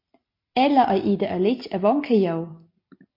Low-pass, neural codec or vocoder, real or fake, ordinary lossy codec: 5.4 kHz; none; real; MP3, 32 kbps